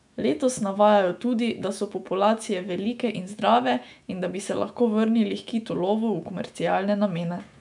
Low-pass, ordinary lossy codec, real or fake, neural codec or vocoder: 10.8 kHz; none; fake; autoencoder, 48 kHz, 128 numbers a frame, DAC-VAE, trained on Japanese speech